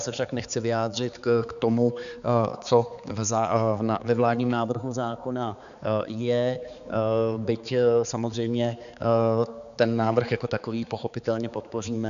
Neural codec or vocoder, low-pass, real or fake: codec, 16 kHz, 4 kbps, X-Codec, HuBERT features, trained on balanced general audio; 7.2 kHz; fake